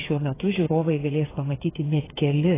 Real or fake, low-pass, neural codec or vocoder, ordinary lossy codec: fake; 3.6 kHz; codec, 16 kHz, 8 kbps, FreqCodec, smaller model; AAC, 16 kbps